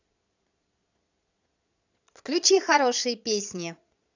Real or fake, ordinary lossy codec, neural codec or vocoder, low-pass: fake; none; vocoder, 22.05 kHz, 80 mel bands, Vocos; 7.2 kHz